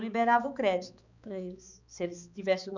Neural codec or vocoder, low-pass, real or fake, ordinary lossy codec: codec, 16 kHz, 4 kbps, X-Codec, HuBERT features, trained on balanced general audio; 7.2 kHz; fake; none